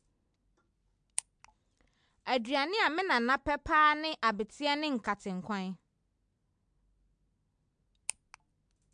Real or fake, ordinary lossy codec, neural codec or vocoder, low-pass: real; MP3, 64 kbps; none; 9.9 kHz